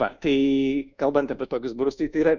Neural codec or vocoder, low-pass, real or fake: codec, 24 kHz, 1.2 kbps, DualCodec; 7.2 kHz; fake